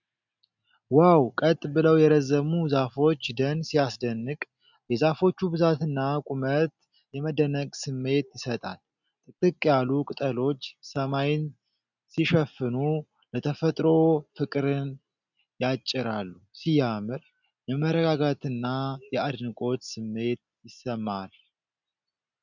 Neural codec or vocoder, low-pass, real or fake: none; 7.2 kHz; real